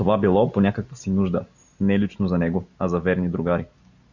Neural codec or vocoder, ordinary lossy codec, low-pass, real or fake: none; Opus, 64 kbps; 7.2 kHz; real